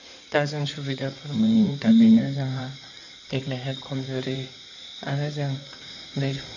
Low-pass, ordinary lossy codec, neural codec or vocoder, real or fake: 7.2 kHz; none; codec, 16 kHz in and 24 kHz out, 2.2 kbps, FireRedTTS-2 codec; fake